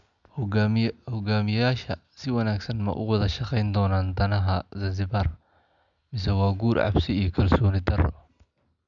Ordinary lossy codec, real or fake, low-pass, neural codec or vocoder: none; real; 7.2 kHz; none